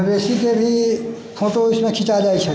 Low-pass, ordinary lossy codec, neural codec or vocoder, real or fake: none; none; none; real